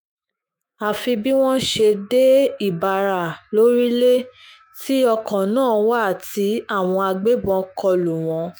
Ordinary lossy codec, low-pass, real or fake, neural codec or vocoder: none; none; fake; autoencoder, 48 kHz, 128 numbers a frame, DAC-VAE, trained on Japanese speech